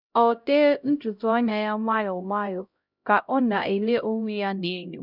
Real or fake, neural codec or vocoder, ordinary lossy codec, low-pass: fake; codec, 16 kHz, 0.5 kbps, X-Codec, HuBERT features, trained on LibriSpeech; none; 5.4 kHz